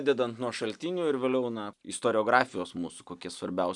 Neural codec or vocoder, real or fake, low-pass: none; real; 10.8 kHz